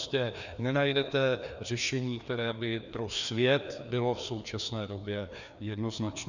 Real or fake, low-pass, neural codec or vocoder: fake; 7.2 kHz; codec, 16 kHz, 2 kbps, FreqCodec, larger model